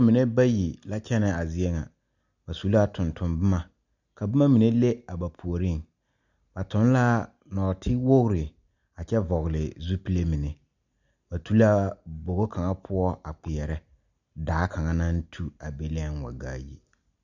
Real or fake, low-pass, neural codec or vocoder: real; 7.2 kHz; none